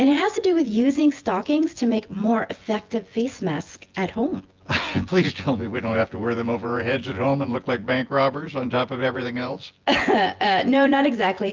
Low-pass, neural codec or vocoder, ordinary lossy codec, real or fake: 7.2 kHz; vocoder, 24 kHz, 100 mel bands, Vocos; Opus, 32 kbps; fake